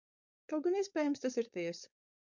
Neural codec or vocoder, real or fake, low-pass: codec, 16 kHz, 4.8 kbps, FACodec; fake; 7.2 kHz